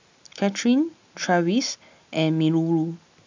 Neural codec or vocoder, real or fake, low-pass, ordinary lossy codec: none; real; 7.2 kHz; MP3, 64 kbps